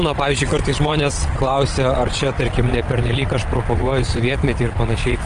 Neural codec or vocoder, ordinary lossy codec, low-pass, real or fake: vocoder, 22.05 kHz, 80 mel bands, WaveNeXt; Opus, 24 kbps; 9.9 kHz; fake